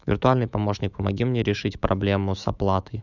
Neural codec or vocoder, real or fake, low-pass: autoencoder, 48 kHz, 128 numbers a frame, DAC-VAE, trained on Japanese speech; fake; 7.2 kHz